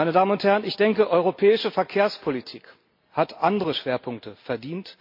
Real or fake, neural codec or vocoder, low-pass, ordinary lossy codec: real; none; 5.4 kHz; MP3, 32 kbps